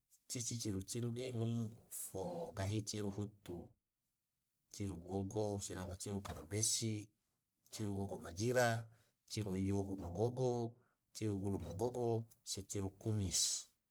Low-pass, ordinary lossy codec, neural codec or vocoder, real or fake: none; none; codec, 44.1 kHz, 1.7 kbps, Pupu-Codec; fake